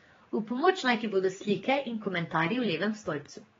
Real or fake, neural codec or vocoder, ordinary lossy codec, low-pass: fake; codec, 16 kHz, 4 kbps, X-Codec, HuBERT features, trained on general audio; AAC, 24 kbps; 7.2 kHz